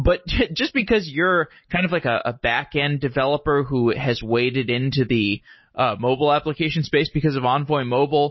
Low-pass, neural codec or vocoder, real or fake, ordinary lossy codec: 7.2 kHz; none; real; MP3, 24 kbps